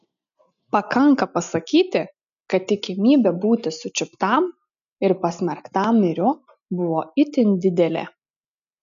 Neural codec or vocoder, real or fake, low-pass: none; real; 7.2 kHz